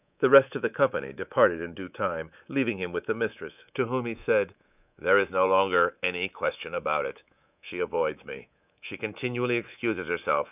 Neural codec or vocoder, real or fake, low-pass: codec, 24 kHz, 3.1 kbps, DualCodec; fake; 3.6 kHz